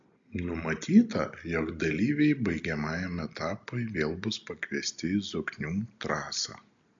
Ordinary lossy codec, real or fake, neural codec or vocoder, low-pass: AAC, 64 kbps; real; none; 7.2 kHz